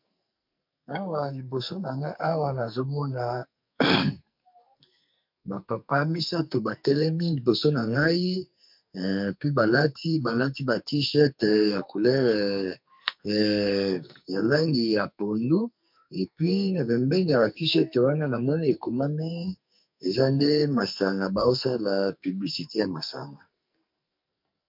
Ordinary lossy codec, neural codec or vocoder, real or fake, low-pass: MP3, 48 kbps; codec, 44.1 kHz, 2.6 kbps, SNAC; fake; 5.4 kHz